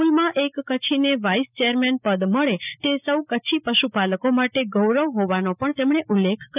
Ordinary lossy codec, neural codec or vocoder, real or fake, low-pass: none; none; real; 3.6 kHz